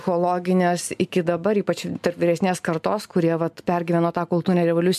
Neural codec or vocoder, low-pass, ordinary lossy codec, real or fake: none; 14.4 kHz; MP3, 96 kbps; real